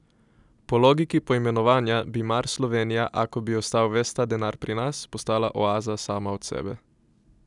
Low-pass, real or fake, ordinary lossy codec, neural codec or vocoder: 10.8 kHz; real; none; none